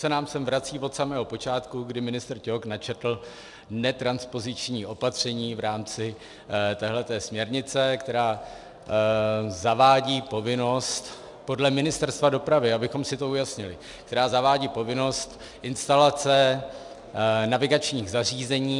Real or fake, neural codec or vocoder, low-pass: real; none; 10.8 kHz